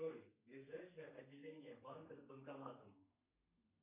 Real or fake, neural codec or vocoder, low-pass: fake; codec, 32 kHz, 1.9 kbps, SNAC; 3.6 kHz